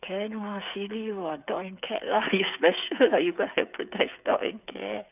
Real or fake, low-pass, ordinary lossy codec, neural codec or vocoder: fake; 3.6 kHz; none; codec, 16 kHz, 8 kbps, FreqCodec, smaller model